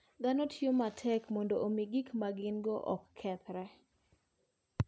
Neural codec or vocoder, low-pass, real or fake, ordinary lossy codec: none; none; real; none